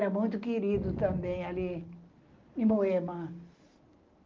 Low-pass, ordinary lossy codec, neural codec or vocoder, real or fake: 7.2 kHz; Opus, 32 kbps; none; real